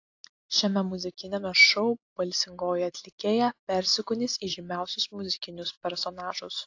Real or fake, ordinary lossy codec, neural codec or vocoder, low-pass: real; AAC, 48 kbps; none; 7.2 kHz